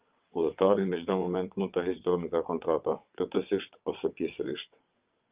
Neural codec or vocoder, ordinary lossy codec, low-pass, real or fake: vocoder, 22.05 kHz, 80 mel bands, WaveNeXt; Opus, 24 kbps; 3.6 kHz; fake